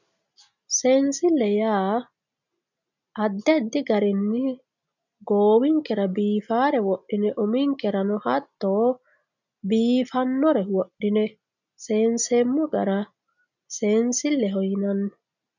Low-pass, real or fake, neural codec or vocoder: 7.2 kHz; real; none